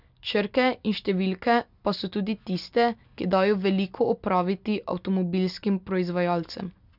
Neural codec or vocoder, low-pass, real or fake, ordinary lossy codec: none; 5.4 kHz; real; none